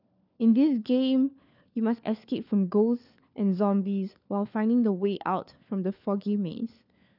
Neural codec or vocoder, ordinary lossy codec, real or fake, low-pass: codec, 16 kHz, 4 kbps, FunCodec, trained on LibriTTS, 50 frames a second; none; fake; 5.4 kHz